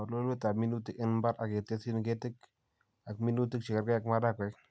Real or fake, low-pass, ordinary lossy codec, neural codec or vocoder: real; none; none; none